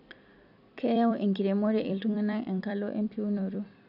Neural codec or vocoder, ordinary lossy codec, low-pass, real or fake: vocoder, 44.1 kHz, 128 mel bands every 256 samples, BigVGAN v2; none; 5.4 kHz; fake